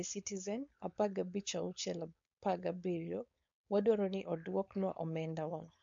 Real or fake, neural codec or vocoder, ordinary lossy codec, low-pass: fake; codec, 16 kHz, 4.8 kbps, FACodec; MP3, 48 kbps; 7.2 kHz